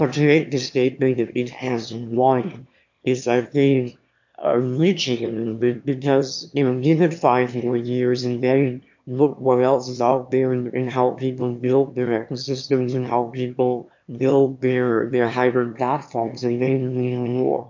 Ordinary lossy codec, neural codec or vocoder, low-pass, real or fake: MP3, 48 kbps; autoencoder, 22.05 kHz, a latent of 192 numbers a frame, VITS, trained on one speaker; 7.2 kHz; fake